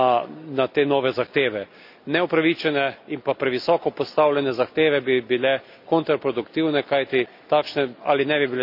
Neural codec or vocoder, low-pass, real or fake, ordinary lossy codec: none; 5.4 kHz; real; none